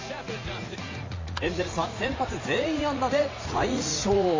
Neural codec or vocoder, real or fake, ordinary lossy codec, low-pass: vocoder, 44.1 kHz, 80 mel bands, Vocos; fake; MP3, 32 kbps; 7.2 kHz